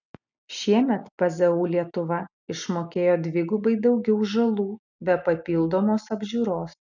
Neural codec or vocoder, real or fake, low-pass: none; real; 7.2 kHz